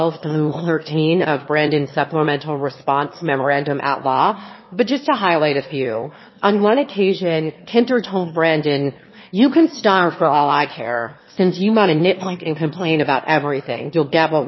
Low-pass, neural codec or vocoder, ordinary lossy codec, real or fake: 7.2 kHz; autoencoder, 22.05 kHz, a latent of 192 numbers a frame, VITS, trained on one speaker; MP3, 24 kbps; fake